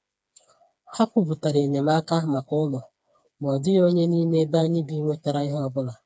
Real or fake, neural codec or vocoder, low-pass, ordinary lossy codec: fake; codec, 16 kHz, 4 kbps, FreqCodec, smaller model; none; none